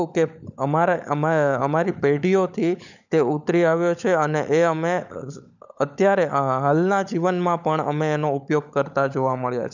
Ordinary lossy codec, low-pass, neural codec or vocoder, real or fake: none; 7.2 kHz; codec, 16 kHz, 16 kbps, FunCodec, trained on LibriTTS, 50 frames a second; fake